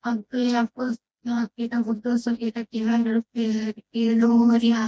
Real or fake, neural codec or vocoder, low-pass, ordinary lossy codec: fake; codec, 16 kHz, 1 kbps, FreqCodec, smaller model; none; none